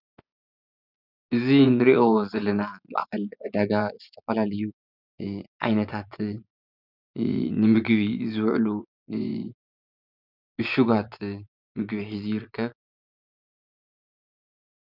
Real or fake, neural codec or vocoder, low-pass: fake; vocoder, 24 kHz, 100 mel bands, Vocos; 5.4 kHz